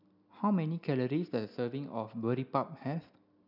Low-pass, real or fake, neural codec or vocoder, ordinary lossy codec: 5.4 kHz; real; none; none